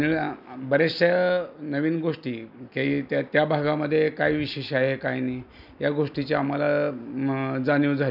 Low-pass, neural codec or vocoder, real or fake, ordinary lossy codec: 5.4 kHz; none; real; none